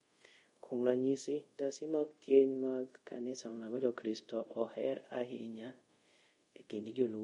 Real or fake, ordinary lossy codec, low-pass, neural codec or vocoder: fake; MP3, 48 kbps; 10.8 kHz; codec, 24 kHz, 0.5 kbps, DualCodec